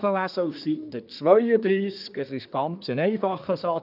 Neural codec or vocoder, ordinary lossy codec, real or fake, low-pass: codec, 24 kHz, 1 kbps, SNAC; none; fake; 5.4 kHz